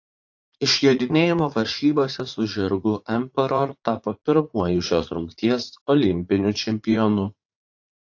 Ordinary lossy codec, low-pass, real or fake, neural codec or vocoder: AAC, 48 kbps; 7.2 kHz; fake; vocoder, 44.1 kHz, 80 mel bands, Vocos